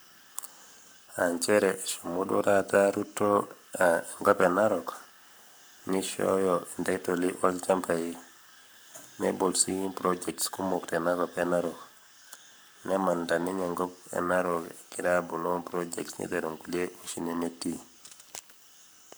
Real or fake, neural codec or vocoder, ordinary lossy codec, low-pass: fake; codec, 44.1 kHz, 7.8 kbps, Pupu-Codec; none; none